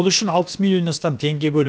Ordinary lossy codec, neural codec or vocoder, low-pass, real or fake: none; codec, 16 kHz, about 1 kbps, DyCAST, with the encoder's durations; none; fake